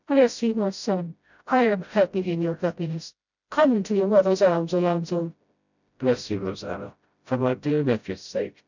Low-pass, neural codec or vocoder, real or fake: 7.2 kHz; codec, 16 kHz, 0.5 kbps, FreqCodec, smaller model; fake